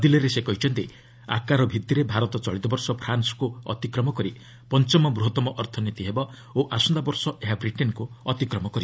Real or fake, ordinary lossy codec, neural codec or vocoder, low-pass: real; none; none; none